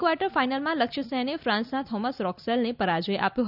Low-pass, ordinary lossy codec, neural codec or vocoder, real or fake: 5.4 kHz; none; none; real